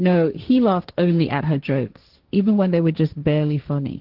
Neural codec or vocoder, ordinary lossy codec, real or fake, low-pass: codec, 16 kHz, 1.1 kbps, Voila-Tokenizer; Opus, 16 kbps; fake; 5.4 kHz